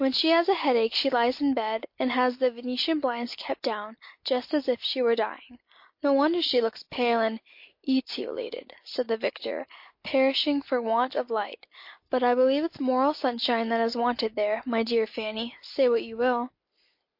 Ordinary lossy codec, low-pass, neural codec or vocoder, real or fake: MP3, 32 kbps; 5.4 kHz; none; real